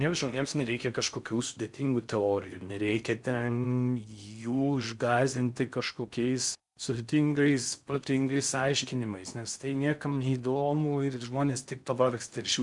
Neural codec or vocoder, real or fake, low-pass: codec, 16 kHz in and 24 kHz out, 0.6 kbps, FocalCodec, streaming, 4096 codes; fake; 10.8 kHz